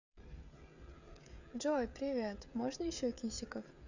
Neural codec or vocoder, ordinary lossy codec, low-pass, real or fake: codec, 16 kHz, 16 kbps, FreqCodec, smaller model; none; 7.2 kHz; fake